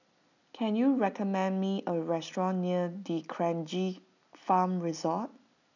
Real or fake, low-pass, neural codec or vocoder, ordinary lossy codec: real; 7.2 kHz; none; none